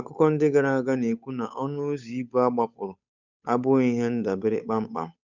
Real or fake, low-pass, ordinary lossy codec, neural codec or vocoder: fake; 7.2 kHz; none; codec, 16 kHz, 8 kbps, FunCodec, trained on Chinese and English, 25 frames a second